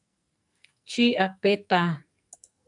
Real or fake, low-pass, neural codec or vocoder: fake; 10.8 kHz; codec, 44.1 kHz, 2.6 kbps, SNAC